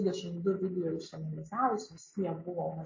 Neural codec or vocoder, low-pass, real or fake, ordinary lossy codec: none; 7.2 kHz; real; MP3, 32 kbps